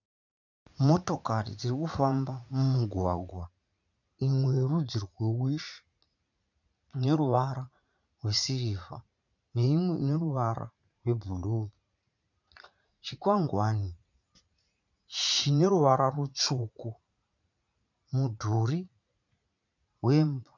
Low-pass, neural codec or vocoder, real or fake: 7.2 kHz; vocoder, 44.1 kHz, 80 mel bands, Vocos; fake